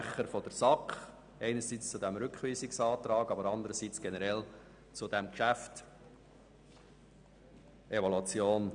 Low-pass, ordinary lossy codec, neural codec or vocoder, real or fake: 9.9 kHz; none; none; real